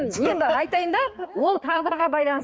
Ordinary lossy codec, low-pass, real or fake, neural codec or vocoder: none; none; fake; codec, 16 kHz, 4 kbps, X-Codec, HuBERT features, trained on balanced general audio